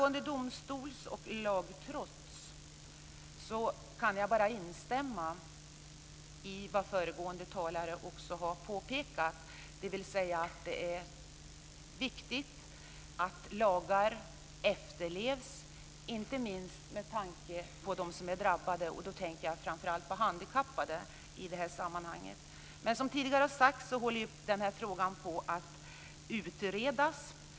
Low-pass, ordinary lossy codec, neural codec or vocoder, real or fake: none; none; none; real